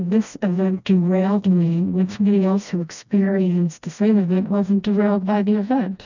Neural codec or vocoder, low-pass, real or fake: codec, 16 kHz, 1 kbps, FreqCodec, smaller model; 7.2 kHz; fake